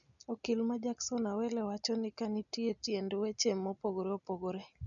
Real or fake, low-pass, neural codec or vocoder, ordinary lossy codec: real; 7.2 kHz; none; none